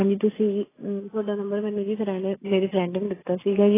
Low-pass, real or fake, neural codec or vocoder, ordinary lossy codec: 3.6 kHz; real; none; AAC, 16 kbps